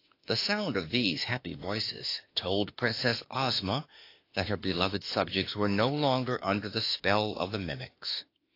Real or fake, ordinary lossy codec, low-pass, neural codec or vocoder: fake; AAC, 32 kbps; 5.4 kHz; autoencoder, 48 kHz, 32 numbers a frame, DAC-VAE, trained on Japanese speech